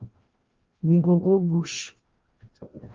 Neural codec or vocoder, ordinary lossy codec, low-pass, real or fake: codec, 16 kHz, 1 kbps, FreqCodec, larger model; Opus, 32 kbps; 7.2 kHz; fake